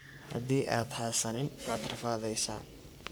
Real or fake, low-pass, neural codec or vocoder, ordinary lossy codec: fake; none; codec, 44.1 kHz, 3.4 kbps, Pupu-Codec; none